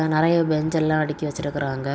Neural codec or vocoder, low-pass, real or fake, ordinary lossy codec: none; none; real; none